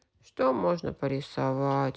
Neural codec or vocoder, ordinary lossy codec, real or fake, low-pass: none; none; real; none